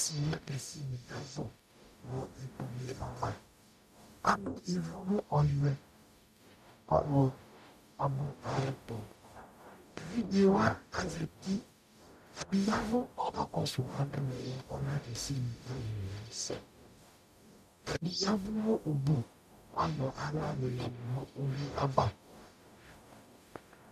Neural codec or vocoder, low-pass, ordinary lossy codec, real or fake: codec, 44.1 kHz, 0.9 kbps, DAC; 14.4 kHz; MP3, 96 kbps; fake